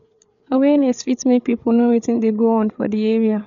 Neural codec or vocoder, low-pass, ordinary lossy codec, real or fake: codec, 16 kHz, 8 kbps, FreqCodec, larger model; 7.2 kHz; none; fake